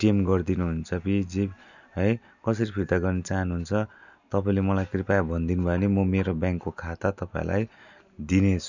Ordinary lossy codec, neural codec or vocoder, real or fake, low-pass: none; none; real; 7.2 kHz